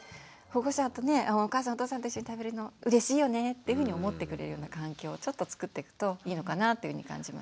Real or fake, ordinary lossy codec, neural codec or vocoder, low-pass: real; none; none; none